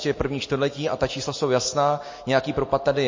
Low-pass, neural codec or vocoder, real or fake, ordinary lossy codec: 7.2 kHz; none; real; MP3, 32 kbps